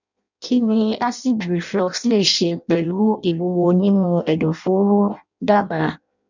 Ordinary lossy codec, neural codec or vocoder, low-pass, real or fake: none; codec, 16 kHz in and 24 kHz out, 0.6 kbps, FireRedTTS-2 codec; 7.2 kHz; fake